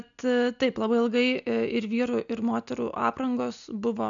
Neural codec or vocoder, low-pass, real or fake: none; 7.2 kHz; real